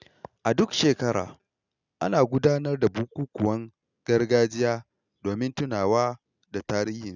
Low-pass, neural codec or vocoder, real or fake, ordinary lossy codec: 7.2 kHz; none; real; none